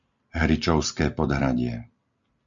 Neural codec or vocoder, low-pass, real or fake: none; 7.2 kHz; real